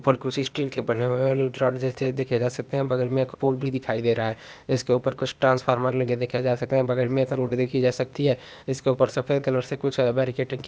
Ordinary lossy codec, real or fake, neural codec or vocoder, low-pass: none; fake; codec, 16 kHz, 0.8 kbps, ZipCodec; none